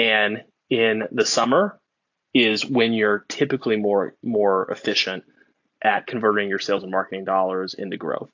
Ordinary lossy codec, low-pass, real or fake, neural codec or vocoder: AAC, 48 kbps; 7.2 kHz; real; none